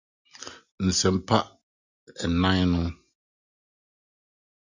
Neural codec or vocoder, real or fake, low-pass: none; real; 7.2 kHz